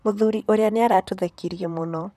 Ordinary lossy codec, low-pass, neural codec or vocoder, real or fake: none; 14.4 kHz; vocoder, 44.1 kHz, 128 mel bands, Pupu-Vocoder; fake